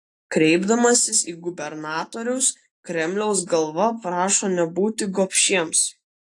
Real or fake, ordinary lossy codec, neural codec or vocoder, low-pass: real; AAC, 48 kbps; none; 10.8 kHz